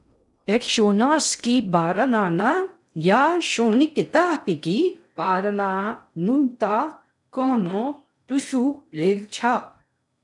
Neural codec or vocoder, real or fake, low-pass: codec, 16 kHz in and 24 kHz out, 0.6 kbps, FocalCodec, streaming, 2048 codes; fake; 10.8 kHz